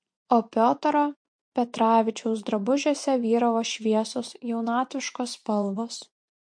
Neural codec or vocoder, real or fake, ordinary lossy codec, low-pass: vocoder, 24 kHz, 100 mel bands, Vocos; fake; MP3, 48 kbps; 9.9 kHz